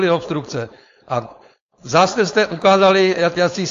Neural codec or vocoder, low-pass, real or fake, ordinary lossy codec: codec, 16 kHz, 4.8 kbps, FACodec; 7.2 kHz; fake; AAC, 48 kbps